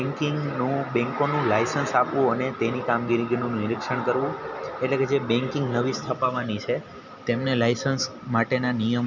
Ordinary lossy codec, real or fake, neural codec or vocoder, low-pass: none; real; none; 7.2 kHz